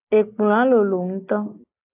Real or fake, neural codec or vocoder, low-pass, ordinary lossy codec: real; none; 3.6 kHz; AAC, 32 kbps